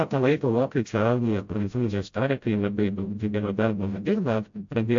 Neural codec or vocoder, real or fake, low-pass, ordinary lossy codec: codec, 16 kHz, 0.5 kbps, FreqCodec, smaller model; fake; 7.2 kHz; MP3, 48 kbps